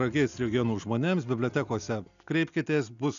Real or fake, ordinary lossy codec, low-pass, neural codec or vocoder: real; MP3, 96 kbps; 7.2 kHz; none